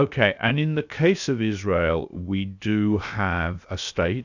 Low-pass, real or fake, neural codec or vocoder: 7.2 kHz; fake; codec, 16 kHz, 0.7 kbps, FocalCodec